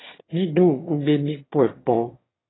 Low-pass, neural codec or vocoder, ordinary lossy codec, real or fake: 7.2 kHz; autoencoder, 22.05 kHz, a latent of 192 numbers a frame, VITS, trained on one speaker; AAC, 16 kbps; fake